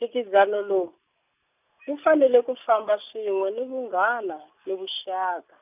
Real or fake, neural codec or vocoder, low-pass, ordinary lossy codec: real; none; 3.6 kHz; none